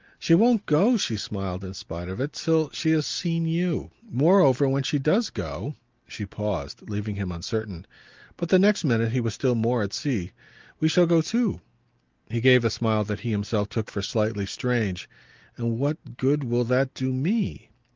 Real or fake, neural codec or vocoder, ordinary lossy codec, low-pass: real; none; Opus, 32 kbps; 7.2 kHz